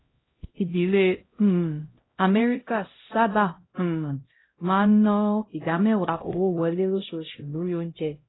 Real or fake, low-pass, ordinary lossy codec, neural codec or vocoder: fake; 7.2 kHz; AAC, 16 kbps; codec, 16 kHz, 0.5 kbps, X-Codec, HuBERT features, trained on LibriSpeech